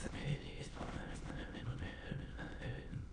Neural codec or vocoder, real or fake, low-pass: autoencoder, 22.05 kHz, a latent of 192 numbers a frame, VITS, trained on many speakers; fake; 9.9 kHz